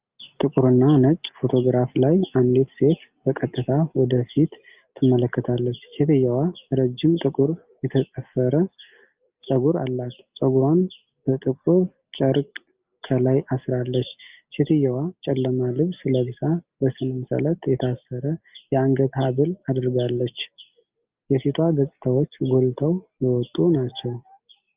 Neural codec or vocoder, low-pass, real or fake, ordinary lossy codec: none; 3.6 kHz; real; Opus, 24 kbps